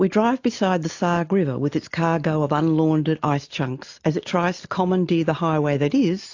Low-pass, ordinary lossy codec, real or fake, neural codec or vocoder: 7.2 kHz; AAC, 48 kbps; real; none